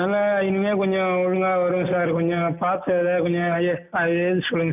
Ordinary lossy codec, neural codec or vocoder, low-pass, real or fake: none; none; 3.6 kHz; real